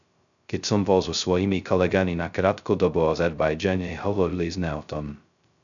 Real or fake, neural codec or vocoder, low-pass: fake; codec, 16 kHz, 0.2 kbps, FocalCodec; 7.2 kHz